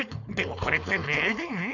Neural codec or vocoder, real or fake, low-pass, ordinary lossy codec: codec, 16 kHz, 4.8 kbps, FACodec; fake; 7.2 kHz; none